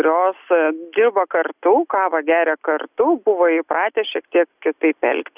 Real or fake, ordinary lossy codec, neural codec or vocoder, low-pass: real; Opus, 64 kbps; none; 3.6 kHz